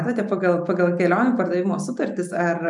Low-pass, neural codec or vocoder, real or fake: 10.8 kHz; none; real